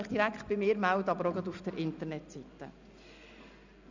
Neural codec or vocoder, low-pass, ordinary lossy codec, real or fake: none; 7.2 kHz; none; real